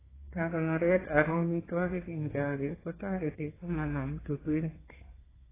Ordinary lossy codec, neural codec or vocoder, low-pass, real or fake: AAC, 16 kbps; codec, 32 kHz, 1.9 kbps, SNAC; 3.6 kHz; fake